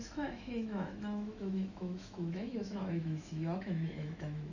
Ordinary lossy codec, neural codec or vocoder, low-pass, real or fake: none; autoencoder, 48 kHz, 128 numbers a frame, DAC-VAE, trained on Japanese speech; 7.2 kHz; fake